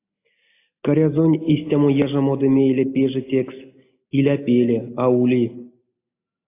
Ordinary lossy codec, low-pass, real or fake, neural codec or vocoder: AAC, 24 kbps; 3.6 kHz; real; none